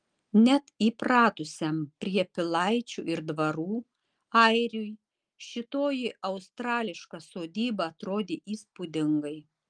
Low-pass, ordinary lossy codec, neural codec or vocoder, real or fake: 9.9 kHz; Opus, 32 kbps; none; real